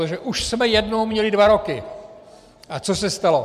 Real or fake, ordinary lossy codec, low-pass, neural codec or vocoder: real; MP3, 96 kbps; 14.4 kHz; none